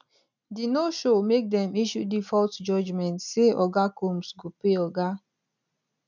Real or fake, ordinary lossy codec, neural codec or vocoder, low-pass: real; none; none; 7.2 kHz